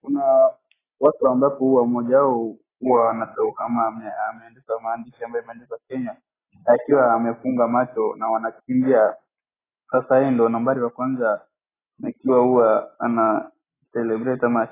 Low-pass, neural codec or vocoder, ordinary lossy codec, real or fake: 3.6 kHz; none; AAC, 16 kbps; real